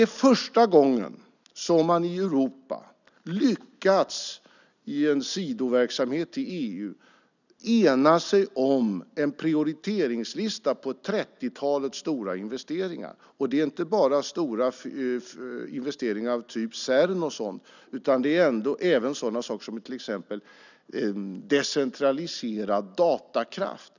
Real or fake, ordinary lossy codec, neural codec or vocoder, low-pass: real; none; none; 7.2 kHz